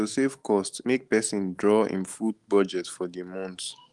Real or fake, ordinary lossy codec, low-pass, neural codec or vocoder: fake; Opus, 24 kbps; 10.8 kHz; autoencoder, 48 kHz, 128 numbers a frame, DAC-VAE, trained on Japanese speech